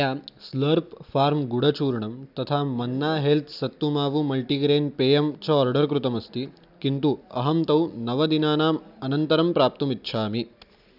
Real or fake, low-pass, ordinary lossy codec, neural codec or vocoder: real; 5.4 kHz; none; none